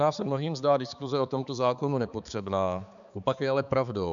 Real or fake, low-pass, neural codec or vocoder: fake; 7.2 kHz; codec, 16 kHz, 4 kbps, X-Codec, HuBERT features, trained on balanced general audio